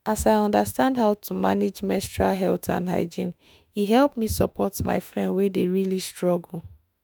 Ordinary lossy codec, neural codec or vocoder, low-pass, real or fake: none; autoencoder, 48 kHz, 32 numbers a frame, DAC-VAE, trained on Japanese speech; none; fake